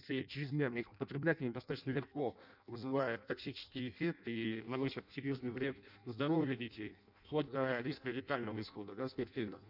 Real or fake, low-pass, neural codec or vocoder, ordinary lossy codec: fake; 5.4 kHz; codec, 16 kHz in and 24 kHz out, 0.6 kbps, FireRedTTS-2 codec; none